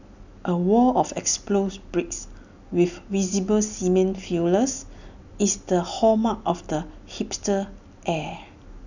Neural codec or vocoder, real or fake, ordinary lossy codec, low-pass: none; real; none; 7.2 kHz